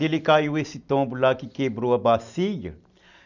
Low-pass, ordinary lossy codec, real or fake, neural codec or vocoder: 7.2 kHz; none; real; none